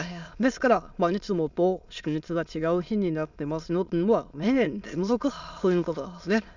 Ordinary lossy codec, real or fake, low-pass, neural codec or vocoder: none; fake; 7.2 kHz; autoencoder, 22.05 kHz, a latent of 192 numbers a frame, VITS, trained on many speakers